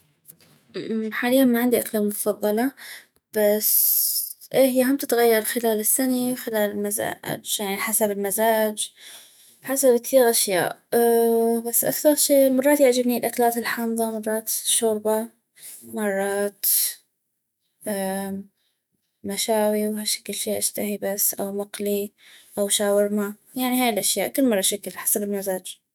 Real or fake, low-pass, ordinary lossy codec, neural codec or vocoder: fake; none; none; autoencoder, 48 kHz, 128 numbers a frame, DAC-VAE, trained on Japanese speech